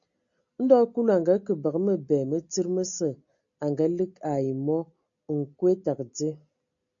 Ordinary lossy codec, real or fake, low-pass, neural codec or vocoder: AAC, 64 kbps; real; 7.2 kHz; none